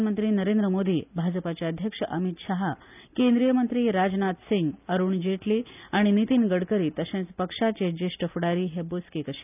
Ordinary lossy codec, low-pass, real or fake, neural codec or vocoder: none; 3.6 kHz; real; none